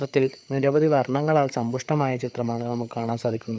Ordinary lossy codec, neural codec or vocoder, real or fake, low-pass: none; codec, 16 kHz, 4 kbps, FreqCodec, larger model; fake; none